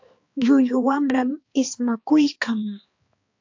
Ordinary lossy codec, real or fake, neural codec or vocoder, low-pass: AAC, 48 kbps; fake; codec, 16 kHz, 2 kbps, X-Codec, HuBERT features, trained on balanced general audio; 7.2 kHz